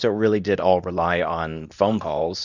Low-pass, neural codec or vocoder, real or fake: 7.2 kHz; codec, 24 kHz, 0.9 kbps, WavTokenizer, medium speech release version 2; fake